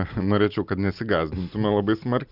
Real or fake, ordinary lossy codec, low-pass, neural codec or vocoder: real; Opus, 64 kbps; 5.4 kHz; none